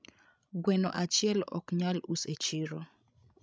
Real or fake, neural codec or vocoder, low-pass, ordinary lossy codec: fake; codec, 16 kHz, 16 kbps, FreqCodec, larger model; none; none